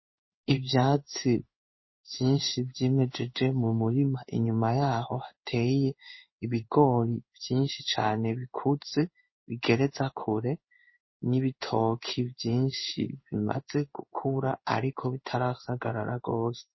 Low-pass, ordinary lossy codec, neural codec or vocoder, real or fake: 7.2 kHz; MP3, 24 kbps; codec, 16 kHz in and 24 kHz out, 1 kbps, XY-Tokenizer; fake